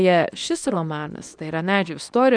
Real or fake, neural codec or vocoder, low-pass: fake; codec, 24 kHz, 0.9 kbps, WavTokenizer, medium speech release version 2; 9.9 kHz